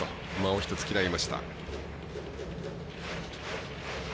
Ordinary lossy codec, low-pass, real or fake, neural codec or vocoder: none; none; real; none